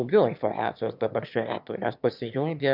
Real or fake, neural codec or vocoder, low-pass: fake; autoencoder, 22.05 kHz, a latent of 192 numbers a frame, VITS, trained on one speaker; 5.4 kHz